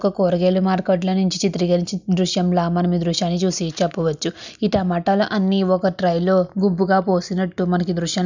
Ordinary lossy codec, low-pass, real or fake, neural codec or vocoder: none; 7.2 kHz; real; none